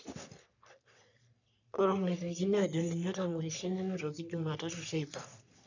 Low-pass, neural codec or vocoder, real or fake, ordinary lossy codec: 7.2 kHz; codec, 44.1 kHz, 3.4 kbps, Pupu-Codec; fake; none